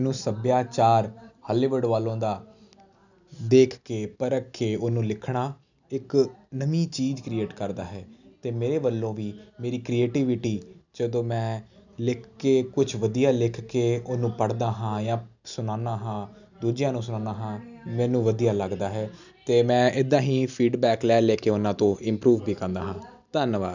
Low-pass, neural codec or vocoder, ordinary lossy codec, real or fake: 7.2 kHz; none; none; real